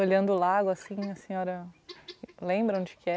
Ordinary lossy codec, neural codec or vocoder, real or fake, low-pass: none; none; real; none